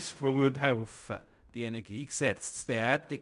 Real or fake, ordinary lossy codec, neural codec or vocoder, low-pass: fake; MP3, 96 kbps; codec, 16 kHz in and 24 kHz out, 0.4 kbps, LongCat-Audio-Codec, fine tuned four codebook decoder; 10.8 kHz